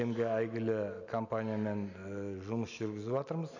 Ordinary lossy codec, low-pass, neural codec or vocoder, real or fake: none; 7.2 kHz; none; real